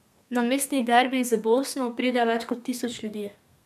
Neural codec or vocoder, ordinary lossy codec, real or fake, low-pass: codec, 32 kHz, 1.9 kbps, SNAC; none; fake; 14.4 kHz